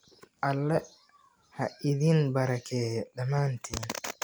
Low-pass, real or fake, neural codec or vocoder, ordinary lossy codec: none; real; none; none